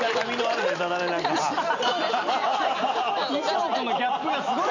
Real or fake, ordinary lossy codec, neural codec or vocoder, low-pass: real; none; none; 7.2 kHz